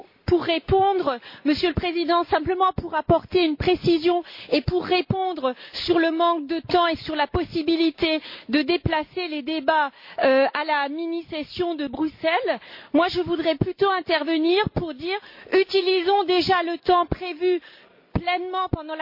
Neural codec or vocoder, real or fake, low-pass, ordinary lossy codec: none; real; 5.4 kHz; MP3, 32 kbps